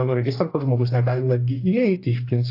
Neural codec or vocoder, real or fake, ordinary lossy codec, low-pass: codec, 44.1 kHz, 2.6 kbps, DAC; fake; AAC, 32 kbps; 5.4 kHz